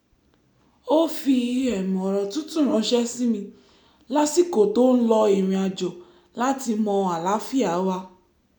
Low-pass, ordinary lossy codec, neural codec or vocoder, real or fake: none; none; none; real